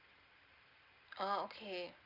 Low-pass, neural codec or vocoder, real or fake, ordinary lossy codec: 5.4 kHz; none; real; Opus, 24 kbps